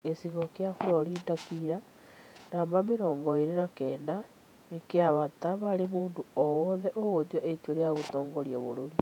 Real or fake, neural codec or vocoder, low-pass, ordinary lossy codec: fake; vocoder, 48 kHz, 128 mel bands, Vocos; 19.8 kHz; none